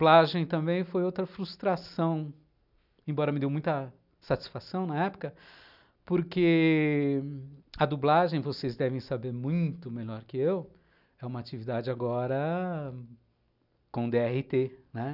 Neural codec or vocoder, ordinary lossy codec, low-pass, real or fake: none; none; 5.4 kHz; real